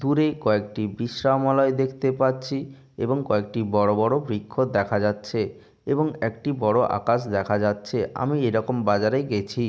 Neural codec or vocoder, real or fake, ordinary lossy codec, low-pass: none; real; none; none